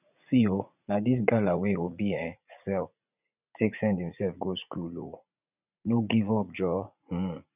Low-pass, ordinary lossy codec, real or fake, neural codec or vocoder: 3.6 kHz; none; fake; vocoder, 44.1 kHz, 80 mel bands, Vocos